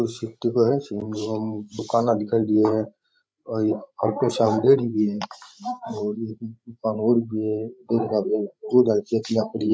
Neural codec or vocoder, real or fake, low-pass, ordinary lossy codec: codec, 16 kHz, 16 kbps, FreqCodec, larger model; fake; none; none